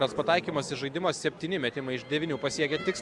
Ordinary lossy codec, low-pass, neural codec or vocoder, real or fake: Opus, 64 kbps; 10.8 kHz; none; real